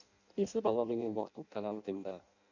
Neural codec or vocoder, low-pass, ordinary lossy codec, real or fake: codec, 16 kHz in and 24 kHz out, 0.6 kbps, FireRedTTS-2 codec; 7.2 kHz; none; fake